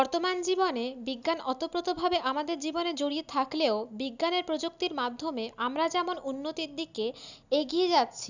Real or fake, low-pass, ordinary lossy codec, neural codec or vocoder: real; 7.2 kHz; none; none